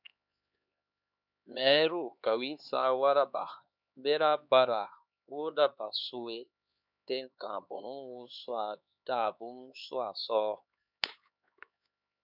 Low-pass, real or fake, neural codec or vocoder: 5.4 kHz; fake; codec, 16 kHz, 4 kbps, X-Codec, HuBERT features, trained on LibriSpeech